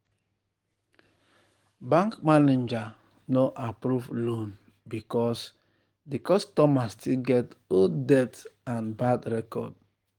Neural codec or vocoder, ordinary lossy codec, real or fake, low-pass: codec, 44.1 kHz, 7.8 kbps, DAC; Opus, 24 kbps; fake; 19.8 kHz